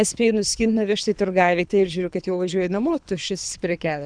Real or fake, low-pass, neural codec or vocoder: fake; 9.9 kHz; codec, 24 kHz, 3 kbps, HILCodec